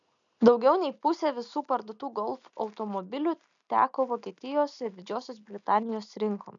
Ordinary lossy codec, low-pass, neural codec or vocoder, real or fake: MP3, 96 kbps; 7.2 kHz; none; real